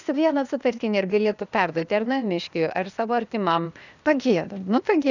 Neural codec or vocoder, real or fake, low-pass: codec, 16 kHz, 0.8 kbps, ZipCodec; fake; 7.2 kHz